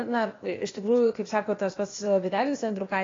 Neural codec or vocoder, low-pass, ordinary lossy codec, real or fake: codec, 16 kHz, 0.8 kbps, ZipCodec; 7.2 kHz; AAC, 32 kbps; fake